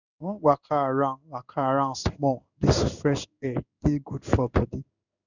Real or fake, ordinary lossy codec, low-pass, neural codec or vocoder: fake; none; 7.2 kHz; codec, 16 kHz in and 24 kHz out, 1 kbps, XY-Tokenizer